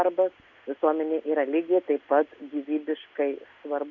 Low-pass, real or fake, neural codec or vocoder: 7.2 kHz; real; none